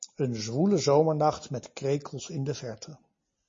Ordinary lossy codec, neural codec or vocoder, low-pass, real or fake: MP3, 32 kbps; none; 7.2 kHz; real